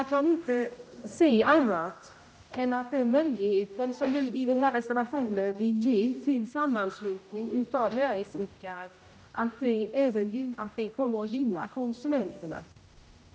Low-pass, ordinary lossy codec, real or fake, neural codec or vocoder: none; none; fake; codec, 16 kHz, 0.5 kbps, X-Codec, HuBERT features, trained on general audio